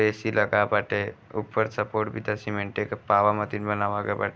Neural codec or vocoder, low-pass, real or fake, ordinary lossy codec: none; none; real; none